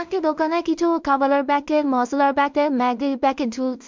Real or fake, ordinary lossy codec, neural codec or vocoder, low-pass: fake; MP3, 64 kbps; codec, 16 kHz in and 24 kHz out, 0.4 kbps, LongCat-Audio-Codec, two codebook decoder; 7.2 kHz